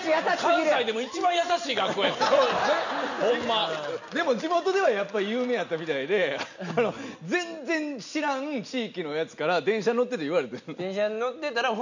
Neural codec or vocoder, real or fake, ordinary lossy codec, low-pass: none; real; none; 7.2 kHz